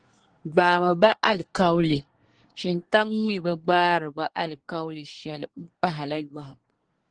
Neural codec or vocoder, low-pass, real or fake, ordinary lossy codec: codec, 24 kHz, 1 kbps, SNAC; 9.9 kHz; fake; Opus, 16 kbps